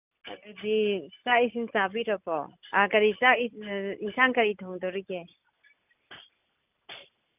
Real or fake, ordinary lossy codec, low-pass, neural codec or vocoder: real; none; 3.6 kHz; none